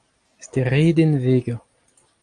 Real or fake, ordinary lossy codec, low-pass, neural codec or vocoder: real; Opus, 32 kbps; 9.9 kHz; none